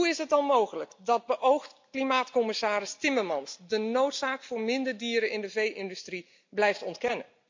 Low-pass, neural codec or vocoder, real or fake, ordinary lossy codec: 7.2 kHz; none; real; MP3, 64 kbps